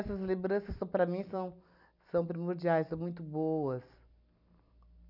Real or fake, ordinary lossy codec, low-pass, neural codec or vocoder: real; none; 5.4 kHz; none